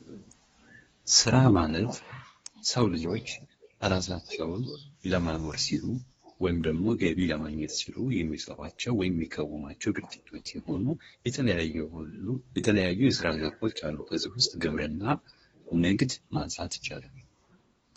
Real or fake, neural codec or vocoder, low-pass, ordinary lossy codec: fake; codec, 24 kHz, 1 kbps, SNAC; 10.8 kHz; AAC, 24 kbps